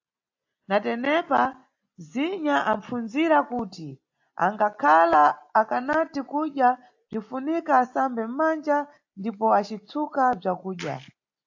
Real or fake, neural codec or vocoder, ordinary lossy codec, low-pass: real; none; AAC, 48 kbps; 7.2 kHz